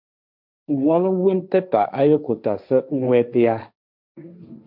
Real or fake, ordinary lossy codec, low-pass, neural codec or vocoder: fake; MP3, 48 kbps; 5.4 kHz; codec, 16 kHz, 1.1 kbps, Voila-Tokenizer